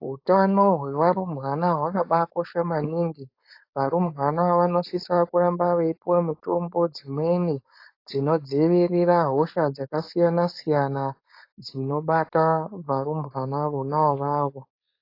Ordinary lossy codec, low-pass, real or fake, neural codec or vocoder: AAC, 32 kbps; 5.4 kHz; fake; codec, 16 kHz, 4.8 kbps, FACodec